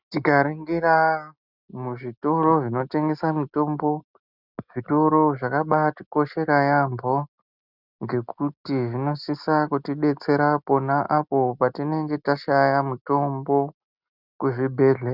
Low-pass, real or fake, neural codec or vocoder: 5.4 kHz; real; none